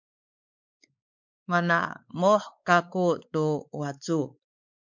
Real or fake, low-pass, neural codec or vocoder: fake; 7.2 kHz; codec, 16 kHz, 4 kbps, X-Codec, WavLM features, trained on Multilingual LibriSpeech